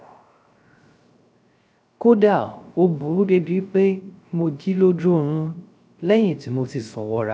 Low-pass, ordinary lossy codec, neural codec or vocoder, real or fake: none; none; codec, 16 kHz, 0.3 kbps, FocalCodec; fake